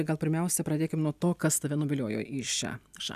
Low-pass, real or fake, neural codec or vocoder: 14.4 kHz; real; none